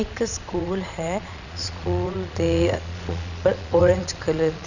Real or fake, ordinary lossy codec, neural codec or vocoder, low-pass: fake; none; vocoder, 22.05 kHz, 80 mel bands, Vocos; 7.2 kHz